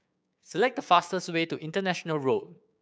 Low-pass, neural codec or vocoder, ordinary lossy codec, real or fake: none; codec, 16 kHz, 6 kbps, DAC; none; fake